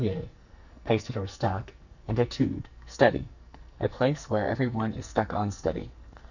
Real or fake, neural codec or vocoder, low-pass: fake; codec, 44.1 kHz, 2.6 kbps, SNAC; 7.2 kHz